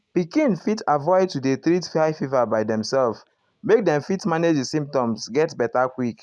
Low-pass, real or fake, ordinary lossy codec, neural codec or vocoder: none; real; none; none